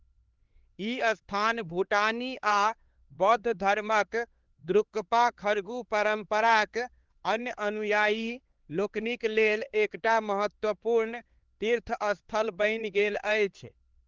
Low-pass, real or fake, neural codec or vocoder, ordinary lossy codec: 7.2 kHz; fake; codec, 16 kHz, 4 kbps, X-Codec, HuBERT features, trained on LibriSpeech; Opus, 16 kbps